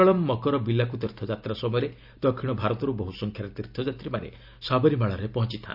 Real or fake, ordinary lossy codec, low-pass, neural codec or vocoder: real; none; 5.4 kHz; none